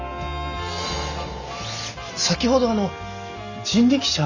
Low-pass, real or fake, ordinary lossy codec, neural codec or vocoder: 7.2 kHz; real; none; none